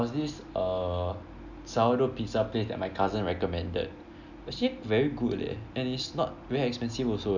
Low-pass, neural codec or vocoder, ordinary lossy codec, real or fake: 7.2 kHz; none; none; real